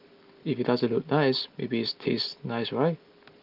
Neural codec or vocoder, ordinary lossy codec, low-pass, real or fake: none; Opus, 32 kbps; 5.4 kHz; real